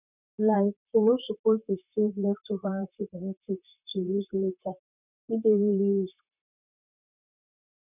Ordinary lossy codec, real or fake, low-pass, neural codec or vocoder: none; fake; 3.6 kHz; vocoder, 44.1 kHz, 128 mel bands, Pupu-Vocoder